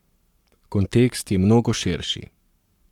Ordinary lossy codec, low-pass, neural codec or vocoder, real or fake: none; 19.8 kHz; codec, 44.1 kHz, 7.8 kbps, Pupu-Codec; fake